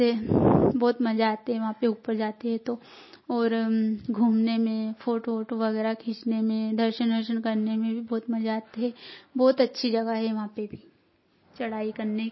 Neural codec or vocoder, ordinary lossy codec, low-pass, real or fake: none; MP3, 24 kbps; 7.2 kHz; real